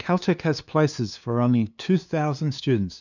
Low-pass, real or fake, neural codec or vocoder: 7.2 kHz; fake; codec, 16 kHz, 2 kbps, FunCodec, trained on LibriTTS, 25 frames a second